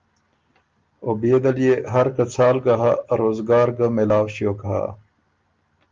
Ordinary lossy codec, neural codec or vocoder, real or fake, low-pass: Opus, 32 kbps; none; real; 7.2 kHz